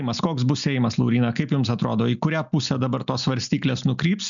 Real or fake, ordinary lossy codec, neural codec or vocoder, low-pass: real; MP3, 96 kbps; none; 7.2 kHz